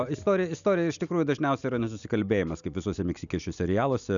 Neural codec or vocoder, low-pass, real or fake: none; 7.2 kHz; real